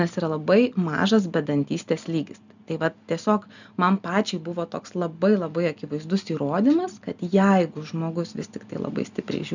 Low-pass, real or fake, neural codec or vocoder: 7.2 kHz; real; none